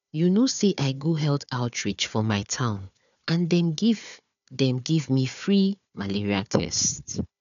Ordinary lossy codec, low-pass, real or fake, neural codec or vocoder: none; 7.2 kHz; fake; codec, 16 kHz, 4 kbps, FunCodec, trained on Chinese and English, 50 frames a second